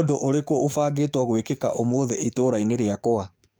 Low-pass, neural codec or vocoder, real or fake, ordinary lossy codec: none; codec, 44.1 kHz, 7.8 kbps, DAC; fake; none